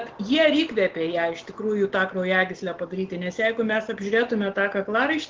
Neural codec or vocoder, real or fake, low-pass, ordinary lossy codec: none; real; 7.2 kHz; Opus, 16 kbps